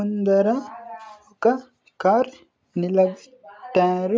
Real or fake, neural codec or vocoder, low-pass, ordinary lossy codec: real; none; none; none